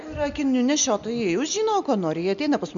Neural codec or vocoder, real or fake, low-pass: none; real; 7.2 kHz